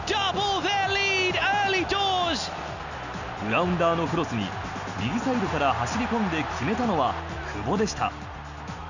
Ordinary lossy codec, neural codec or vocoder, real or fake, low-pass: none; none; real; 7.2 kHz